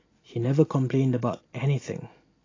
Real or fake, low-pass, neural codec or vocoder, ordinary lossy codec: real; 7.2 kHz; none; AAC, 32 kbps